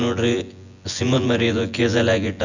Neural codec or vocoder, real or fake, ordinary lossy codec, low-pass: vocoder, 24 kHz, 100 mel bands, Vocos; fake; none; 7.2 kHz